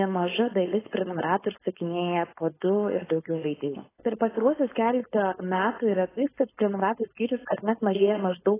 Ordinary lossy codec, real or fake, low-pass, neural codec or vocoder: AAC, 16 kbps; fake; 3.6 kHz; codec, 16 kHz, 4.8 kbps, FACodec